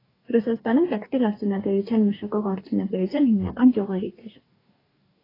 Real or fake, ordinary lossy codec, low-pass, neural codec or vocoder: fake; AAC, 24 kbps; 5.4 kHz; codec, 44.1 kHz, 2.6 kbps, DAC